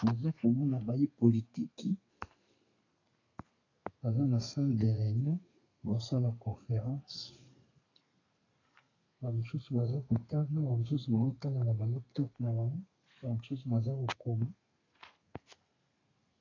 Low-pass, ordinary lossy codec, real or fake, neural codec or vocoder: 7.2 kHz; AAC, 32 kbps; fake; codec, 32 kHz, 1.9 kbps, SNAC